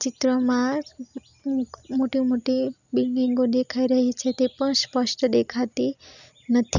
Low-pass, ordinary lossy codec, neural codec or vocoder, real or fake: 7.2 kHz; none; none; real